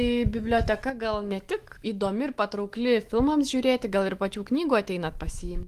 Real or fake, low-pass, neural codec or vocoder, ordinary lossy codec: fake; 14.4 kHz; autoencoder, 48 kHz, 128 numbers a frame, DAC-VAE, trained on Japanese speech; Opus, 24 kbps